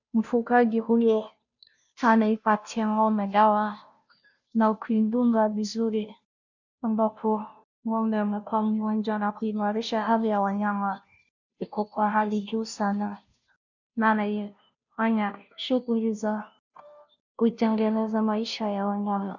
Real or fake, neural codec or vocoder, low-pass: fake; codec, 16 kHz, 0.5 kbps, FunCodec, trained on Chinese and English, 25 frames a second; 7.2 kHz